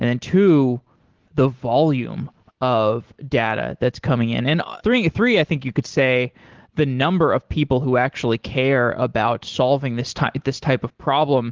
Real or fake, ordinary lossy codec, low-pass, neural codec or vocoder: real; Opus, 16 kbps; 7.2 kHz; none